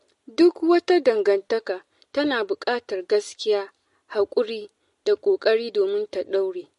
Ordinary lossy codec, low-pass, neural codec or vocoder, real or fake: MP3, 48 kbps; 14.4 kHz; none; real